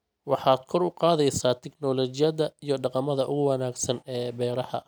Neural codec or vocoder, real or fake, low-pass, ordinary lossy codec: none; real; none; none